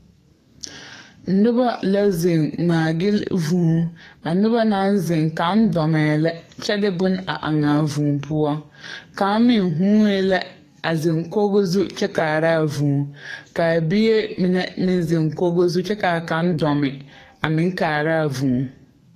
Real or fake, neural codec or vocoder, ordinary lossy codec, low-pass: fake; codec, 44.1 kHz, 2.6 kbps, SNAC; AAC, 48 kbps; 14.4 kHz